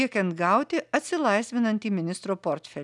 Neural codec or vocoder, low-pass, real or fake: none; 10.8 kHz; real